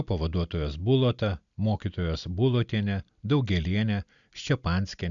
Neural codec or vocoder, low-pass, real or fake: none; 7.2 kHz; real